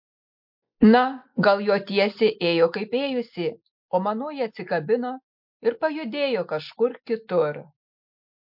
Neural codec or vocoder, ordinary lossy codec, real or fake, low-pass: none; MP3, 48 kbps; real; 5.4 kHz